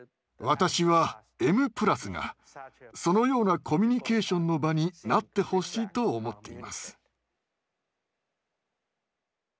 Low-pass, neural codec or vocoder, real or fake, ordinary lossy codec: none; none; real; none